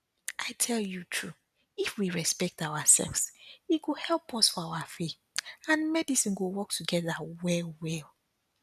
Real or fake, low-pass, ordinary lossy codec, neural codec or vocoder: real; 14.4 kHz; none; none